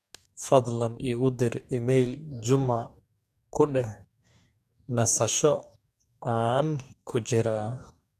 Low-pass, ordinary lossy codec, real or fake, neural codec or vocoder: 14.4 kHz; none; fake; codec, 44.1 kHz, 2.6 kbps, DAC